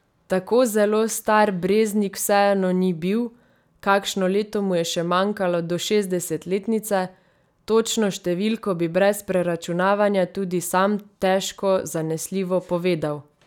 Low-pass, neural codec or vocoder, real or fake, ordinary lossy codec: 19.8 kHz; none; real; none